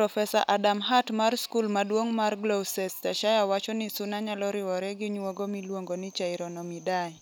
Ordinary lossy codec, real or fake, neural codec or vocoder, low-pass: none; real; none; none